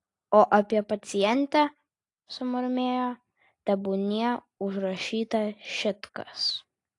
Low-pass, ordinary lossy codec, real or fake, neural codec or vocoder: 10.8 kHz; AAC, 48 kbps; real; none